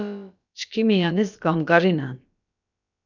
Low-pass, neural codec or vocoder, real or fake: 7.2 kHz; codec, 16 kHz, about 1 kbps, DyCAST, with the encoder's durations; fake